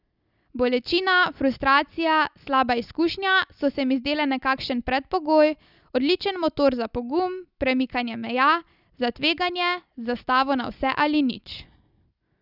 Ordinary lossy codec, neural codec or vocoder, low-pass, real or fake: none; none; 5.4 kHz; real